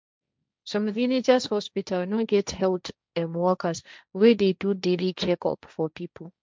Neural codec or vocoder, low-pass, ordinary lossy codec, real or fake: codec, 16 kHz, 1.1 kbps, Voila-Tokenizer; none; none; fake